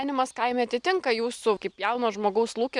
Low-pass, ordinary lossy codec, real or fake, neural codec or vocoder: 10.8 kHz; Opus, 64 kbps; real; none